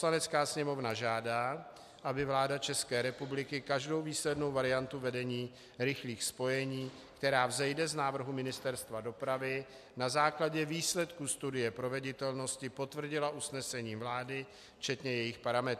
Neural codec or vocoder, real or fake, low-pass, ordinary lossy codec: none; real; 14.4 kHz; AAC, 96 kbps